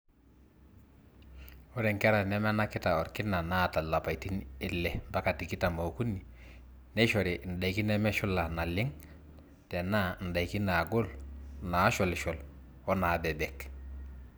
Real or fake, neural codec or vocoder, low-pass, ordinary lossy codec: real; none; none; none